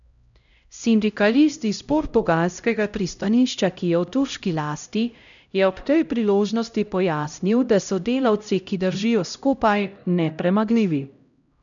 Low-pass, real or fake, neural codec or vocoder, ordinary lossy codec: 7.2 kHz; fake; codec, 16 kHz, 0.5 kbps, X-Codec, HuBERT features, trained on LibriSpeech; none